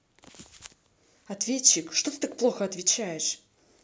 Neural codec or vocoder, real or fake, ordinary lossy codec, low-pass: none; real; none; none